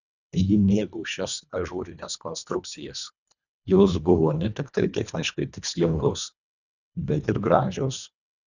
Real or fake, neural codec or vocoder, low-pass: fake; codec, 24 kHz, 1.5 kbps, HILCodec; 7.2 kHz